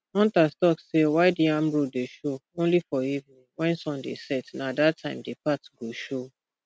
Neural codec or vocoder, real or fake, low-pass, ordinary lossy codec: none; real; none; none